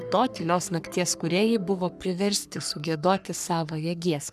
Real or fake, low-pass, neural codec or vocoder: fake; 14.4 kHz; codec, 44.1 kHz, 3.4 kbps, Pupu-Codec